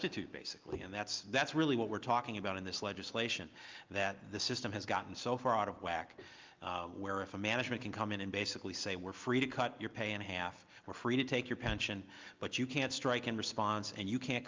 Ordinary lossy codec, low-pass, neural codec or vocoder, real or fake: Opus, 24 kbps; 7.2 kHz; none; real